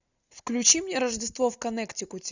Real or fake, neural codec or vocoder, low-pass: real; none; 7.2 kHz